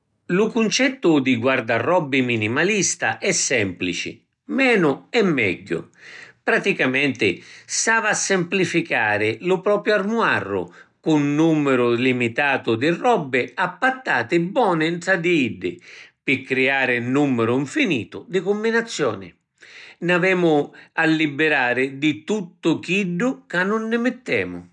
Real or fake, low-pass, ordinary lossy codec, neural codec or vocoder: real; 10.8 kHz; none; none